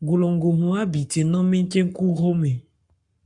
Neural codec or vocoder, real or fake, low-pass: codec, 44.1 kHz, 7.8 kbps, Pupu-Codec; fake; 10.8 kHz